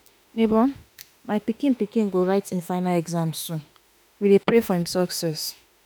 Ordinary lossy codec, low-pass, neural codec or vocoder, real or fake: none; none; autoencoder, 48 kHz, 32 numbers a frame, DAC-VAE, trained on Japanese speech; fake